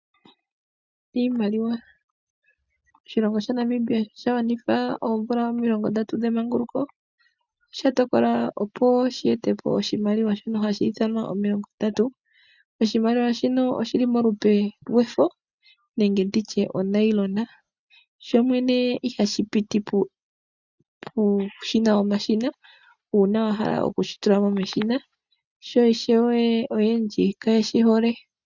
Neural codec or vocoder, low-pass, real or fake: none; 7.2 kHz; real